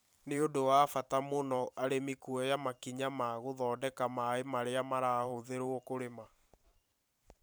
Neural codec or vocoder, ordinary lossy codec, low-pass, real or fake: vocoder, 44.1 kHz, 128 mel bands every 512 samples, BigVGAN v2; none; none; fake